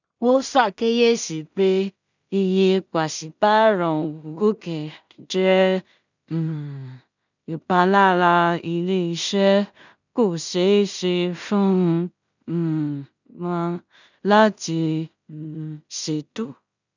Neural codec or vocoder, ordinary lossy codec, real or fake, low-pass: codec, 16 kHz in and 24 kHz out, 0.4 kbps, LongCat-Audio-Codec, two codebook decoder; none; fake; 7.2 kHz